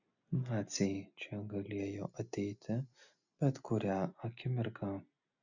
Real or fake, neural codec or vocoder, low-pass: real; none; 7.2 kHz